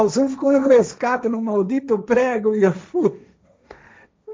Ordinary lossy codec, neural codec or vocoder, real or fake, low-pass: none; codec, 16 kHz, 1.1 kbps, Voila-Tokenizer; fake; none